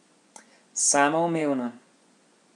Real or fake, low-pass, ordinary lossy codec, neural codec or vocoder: fake; 10.8 kHz; none; vocoder, 24 kHz, 100 mel bands, Vocos